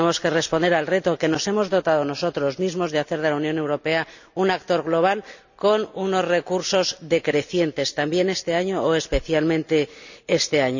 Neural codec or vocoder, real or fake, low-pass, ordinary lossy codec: none; real; 7.2 kHz; none